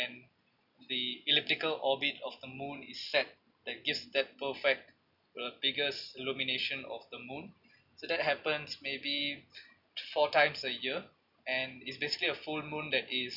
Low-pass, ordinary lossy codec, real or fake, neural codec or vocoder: 5.4 kHz; none; real; none